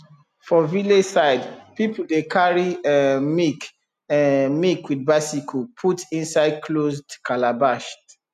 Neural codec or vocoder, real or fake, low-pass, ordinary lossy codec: none; real; 14.4 kHz; none